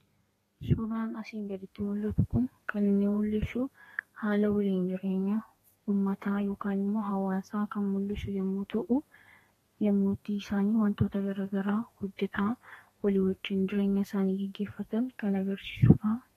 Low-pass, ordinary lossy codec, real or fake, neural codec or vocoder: 14.4 kHz; AAC, 48 kbps; fake; codec, 32 kHz, 1.9 kbps, SNAC